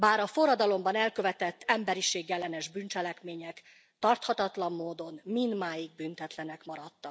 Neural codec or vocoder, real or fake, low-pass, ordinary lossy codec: none; real; none; none